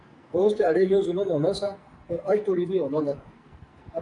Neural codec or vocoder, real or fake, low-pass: codec, 44.1 kHz, 2.6 kbps, SNAC; fake; 10.8 kHz